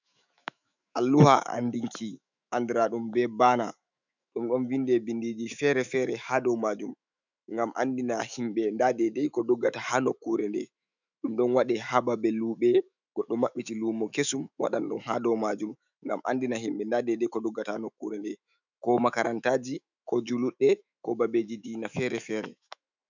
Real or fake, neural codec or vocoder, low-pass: fake; autoencoder, 48 kHz, 128 numbers a frame, DAC-VAE, trained on Japanese speech; 7.2 kHz